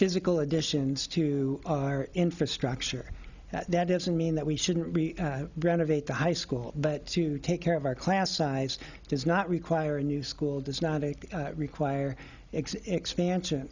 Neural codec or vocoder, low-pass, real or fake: none; 7.2 kHz; real